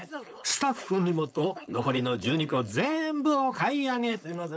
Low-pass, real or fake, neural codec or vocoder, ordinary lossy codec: none; fake; codec, 16 kHz, 4.8 kbps, FACodec; none